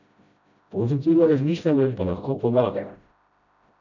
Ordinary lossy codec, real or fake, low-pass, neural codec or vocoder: none; fake; 7.2 kHz; codec, 16 kHz, 0.5 kbps, FreqCodec, smaller model